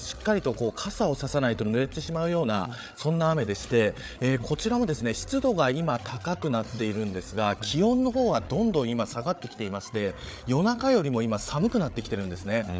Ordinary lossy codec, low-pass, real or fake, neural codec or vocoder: none; none; fake; codec, 16 kHz, 8 kbps, FreqCodec, larger model